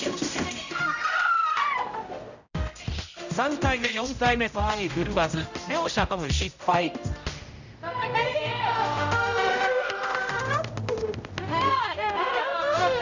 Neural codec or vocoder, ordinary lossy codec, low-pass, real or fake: codec, 16 kHz, 0.5 kbps, X-Codec, HuBERT features, trained on general audio; none; 7.2 kHz; fake